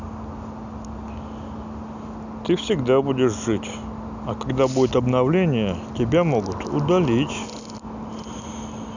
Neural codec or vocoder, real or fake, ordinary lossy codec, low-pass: none; real; none; 7.2 kHz